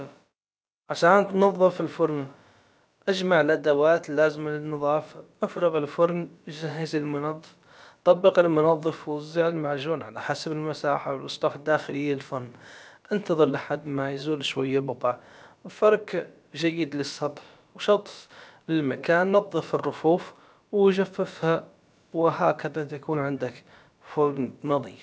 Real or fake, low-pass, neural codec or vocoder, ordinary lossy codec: fake; none; codec, 16 kHz, about 1 kbps, DyCAST, with the encoder's durations; none